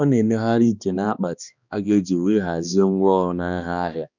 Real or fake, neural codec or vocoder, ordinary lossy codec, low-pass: fake; codec, 16 kHz, 2 kbps, X-Codec, HuBERT features, trained on balanced general audio; none; 7.2 kHz